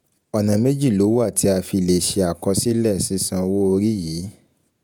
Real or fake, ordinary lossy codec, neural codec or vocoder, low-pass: fake; none; vocoder, 48 kHz, 128 mel bands, Vocos; none